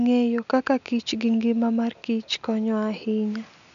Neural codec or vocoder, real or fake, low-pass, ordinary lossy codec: none; real; 7.2 kHz; none